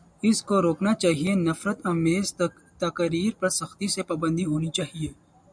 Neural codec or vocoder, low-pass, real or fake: none; 9.9 kHz; real